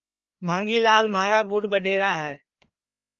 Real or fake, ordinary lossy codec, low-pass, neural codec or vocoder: fake; Opus, 32 kbps; 7.2 kHz; codec, 16 kHz, 1 kbps, FreqCodec, larger model